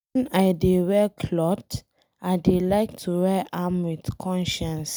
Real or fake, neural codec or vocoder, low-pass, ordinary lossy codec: real; none; none; none